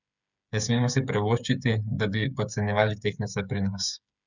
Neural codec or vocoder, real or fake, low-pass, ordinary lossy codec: codec, 16 kHz, 8 kbps, FreqCodec, smaller model; fake; 7.2 kHz; none